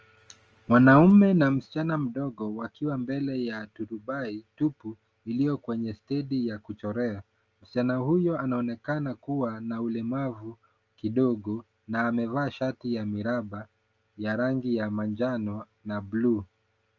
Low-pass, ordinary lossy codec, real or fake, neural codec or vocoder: 7.2 kHz; Opus, 24 kbps; real; none